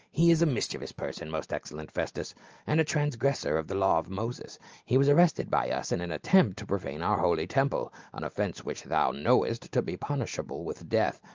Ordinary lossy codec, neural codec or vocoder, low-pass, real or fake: Opus, 24 kbps; none; 7.2 kHz; real